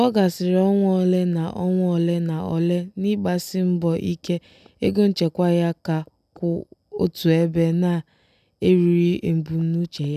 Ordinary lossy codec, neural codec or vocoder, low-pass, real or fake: none; none; 14.4 kHz; real